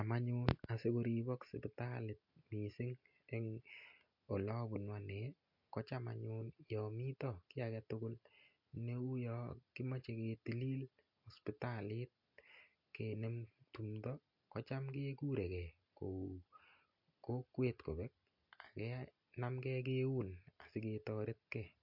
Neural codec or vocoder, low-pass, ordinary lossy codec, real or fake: none; 5.4 kHz; MP3, 48 kbps; real